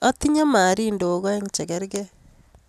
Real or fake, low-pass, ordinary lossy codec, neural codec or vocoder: real; 14.4 kHz; none; none